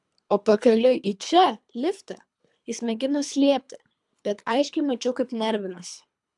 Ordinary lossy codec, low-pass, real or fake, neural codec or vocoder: MP3, 96 kbps; 10.8 kHz; fake; codec, 24 kHz, 3 kbps, HILCodec